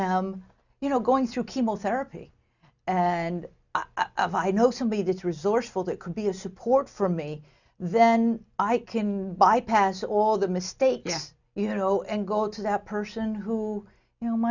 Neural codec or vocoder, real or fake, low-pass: none; real; 7.2 kHz